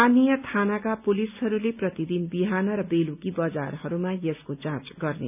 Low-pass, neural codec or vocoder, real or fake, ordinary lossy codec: 3.6 kHz; none; real; none